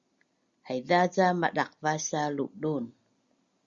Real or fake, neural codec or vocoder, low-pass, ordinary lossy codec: real; none; 7.2 kHz; Opus, 64 kbps